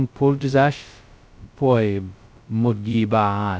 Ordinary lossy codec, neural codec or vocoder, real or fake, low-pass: none; codec, 16 kHz, 0.2 kbps, FocalCodec; fake; none